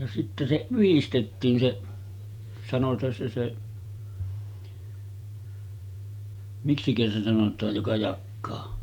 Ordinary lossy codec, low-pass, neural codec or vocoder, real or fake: none; 19.8 kHz; vocoder, 48 kHz, 128 mel bands, Vocos; fake